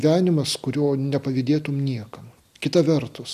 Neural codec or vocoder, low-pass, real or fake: none; 14.4 kHz; real